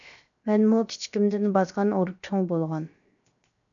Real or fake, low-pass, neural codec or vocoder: fake; 7.2 kHz; codec, 16 kHz, 0.7 kbps, FocalCodec